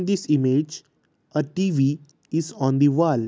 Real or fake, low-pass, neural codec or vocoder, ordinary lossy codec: real; none; none; none